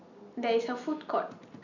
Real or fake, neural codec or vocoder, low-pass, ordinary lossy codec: real; none; 7.2 kHz; none